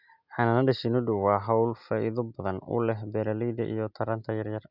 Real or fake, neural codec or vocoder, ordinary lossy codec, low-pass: real; none; none; 5.4 kHz